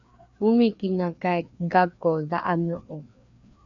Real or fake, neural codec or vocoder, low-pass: fake; codec, 16 kHz, 2 kbps, FreqCodec, larger model; 7.2 kHz